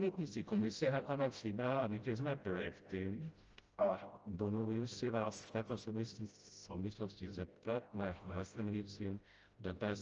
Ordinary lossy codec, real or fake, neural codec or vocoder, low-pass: Opus, 24 kbps; fake; codec, 16 kHz, 0.5 kbps, FreqCodec, smaller model; 7.2 kHz